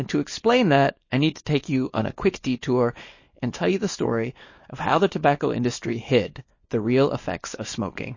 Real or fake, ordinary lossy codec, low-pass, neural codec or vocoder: fake; MP3, 32 kbps; 7.2 kHz; codec, 24 kHz, 0.9 kbps, WavTokenizer, small release